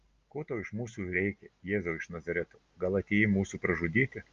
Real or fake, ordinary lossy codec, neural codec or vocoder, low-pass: real; Opus, 32 kbps; none; 7.2 kHz